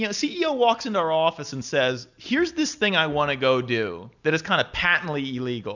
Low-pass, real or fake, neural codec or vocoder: 7.2 kHz; real; none